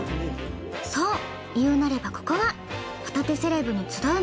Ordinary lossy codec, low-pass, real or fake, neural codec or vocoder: none; none; real; none